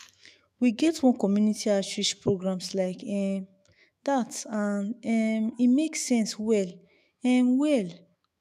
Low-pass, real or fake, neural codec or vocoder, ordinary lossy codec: 14.4 kHz; fake; autoencoder, 48 kHz, 128 numbers a frame, DAC-VAE, trained on Japanese speech; none